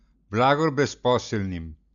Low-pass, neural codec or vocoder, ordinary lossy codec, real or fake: 7.2 kHz; none; none; real